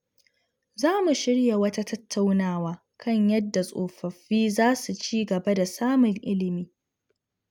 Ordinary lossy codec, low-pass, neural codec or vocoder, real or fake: none; 19.8 kHz; none; real